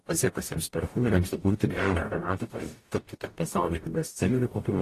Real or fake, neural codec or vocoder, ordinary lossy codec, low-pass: fake; codec, 44.1 kHz, 0.9 kbps, DAC; AAC, 48 kbps; 14.4 kHz